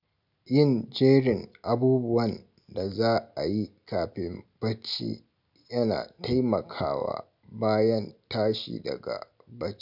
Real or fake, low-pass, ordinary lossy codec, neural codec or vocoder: real; 5.4 kHz; none; none